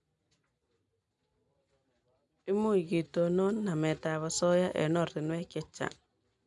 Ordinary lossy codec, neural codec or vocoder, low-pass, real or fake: none; none; 10.8 kHz; real